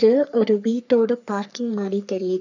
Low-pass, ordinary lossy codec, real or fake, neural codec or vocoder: 7.2 kHz; none; fake; codec, 44.1 kHz, 3.4 kbps, Pupu-Codec